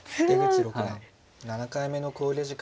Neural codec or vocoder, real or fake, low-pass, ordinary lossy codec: none; real; none; none